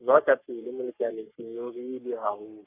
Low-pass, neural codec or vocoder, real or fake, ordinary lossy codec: 3.6 kHz; codec, 44.1 kHz, 3.4 kbps, Pupu-Codec; fake; Opus, 64 kbps